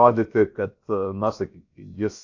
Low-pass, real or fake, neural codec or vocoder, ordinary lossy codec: 7.2 kHz; fake; codec, 16 kHz, about 1 kbps, DyCAST, with the encoder's durations; AAC, 48 kbps